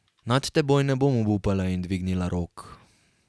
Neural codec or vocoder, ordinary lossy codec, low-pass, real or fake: none; none; none; real